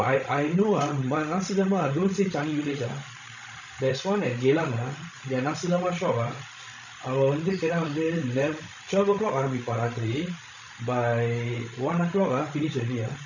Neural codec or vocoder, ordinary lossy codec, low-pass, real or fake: codec, 16 kHz, 16 kbps, FreqCodec, larger model; none; 7.2 kHz; fake